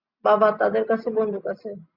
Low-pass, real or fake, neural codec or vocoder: 5.4 kHz; real; none